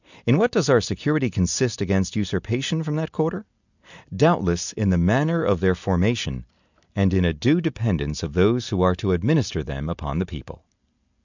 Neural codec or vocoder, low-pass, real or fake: none; 7.2 kHz; real